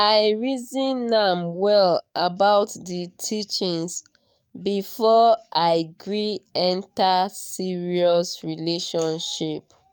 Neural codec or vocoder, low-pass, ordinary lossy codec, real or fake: codec, 44.1 kHz, 7.8 kbps, DAC; 19.8 kHz; none; fake